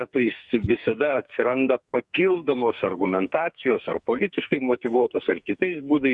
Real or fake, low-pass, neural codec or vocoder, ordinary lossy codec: fake; 10.8 kHz; codec, 44.1 kHz, 2.6 kbps, SNAC; Opus, 64 kbps